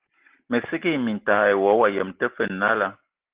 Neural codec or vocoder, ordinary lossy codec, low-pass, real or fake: none; Opus, 16 kbps; 3.6 kHz; real